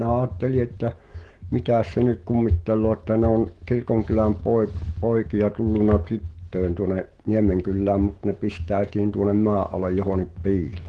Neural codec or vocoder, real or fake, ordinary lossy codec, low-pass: autoencoder, 48 kHz, 128 numbers a frame, DAC-VAE, trained on Japanese speech; fake; Opus, 16 kbps; 10.8 kHz